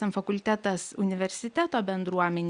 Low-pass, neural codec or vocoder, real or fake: 9.9 kHz; none; real